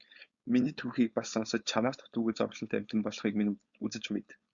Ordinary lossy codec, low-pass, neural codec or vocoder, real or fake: Opus, 64 kbps; 7.2 kHz; codec, 16 kHz, 4.8 kbps, FACodec; fake